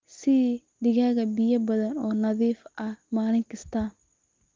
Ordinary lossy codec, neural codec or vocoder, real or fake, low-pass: Opus, 32 kbps; none; real; 7.2 kHz